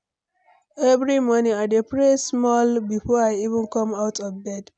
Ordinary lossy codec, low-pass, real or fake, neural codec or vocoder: none; 9.9 kHz; real; none